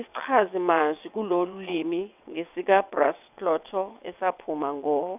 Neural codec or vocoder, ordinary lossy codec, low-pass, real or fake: vocoder, 22.05 kHz, 80 mel bands, WaveNeXt; none; 3.6 kHz; fake